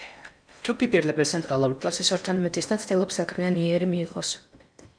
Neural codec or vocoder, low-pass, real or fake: codec, 16 kHz in and 24 kHz out, 0.6 kbps, FocalCodec, streaming, 4096 codes; 9.9 kHz; fake